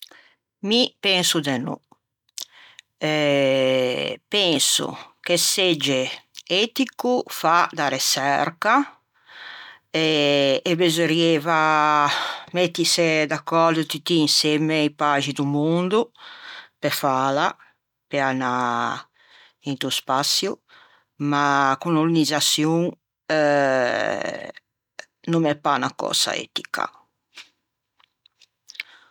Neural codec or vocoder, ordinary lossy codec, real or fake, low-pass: none; none; real; 19.8 kHz